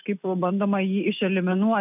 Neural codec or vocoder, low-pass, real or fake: none; 3.6 kHz; real